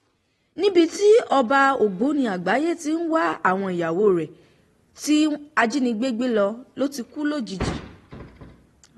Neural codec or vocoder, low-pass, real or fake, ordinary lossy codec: none; 19.8 kHz; real; AAC, 32 kbps